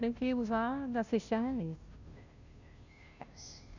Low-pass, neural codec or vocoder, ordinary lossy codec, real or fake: 7.2 kHz; codec, 16 kHz, 0.5 kbps, FunCodec, trained on Chinese and English, 25 frames a second; none; fake